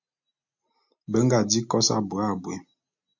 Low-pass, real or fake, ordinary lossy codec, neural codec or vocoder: 7.2 kHz; real; MP3, 48 kbps; none